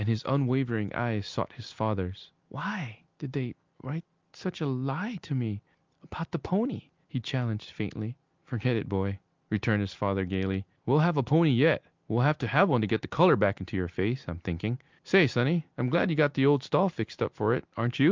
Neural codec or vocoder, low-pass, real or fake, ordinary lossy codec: none; 7.2 kHz; real; Opus, 32 kbps